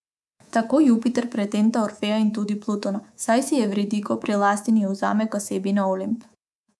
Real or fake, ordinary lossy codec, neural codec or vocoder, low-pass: fake; none; codec, 24 kHz, 3.1 kbps, DualCodec; none